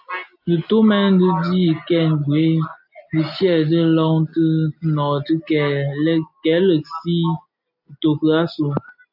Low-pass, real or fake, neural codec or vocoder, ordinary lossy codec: 5.4 kHz; real; none; AAC, 48 kbps